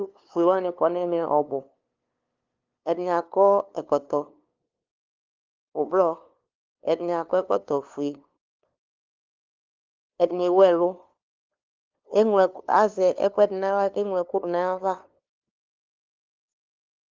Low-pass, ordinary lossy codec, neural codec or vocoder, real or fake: 7.2 kHz; Opus, 32 kbps; codec, 16 kHz, 2 kbps, FunCodec, trained on LibriTTS, 25 frames a second; fake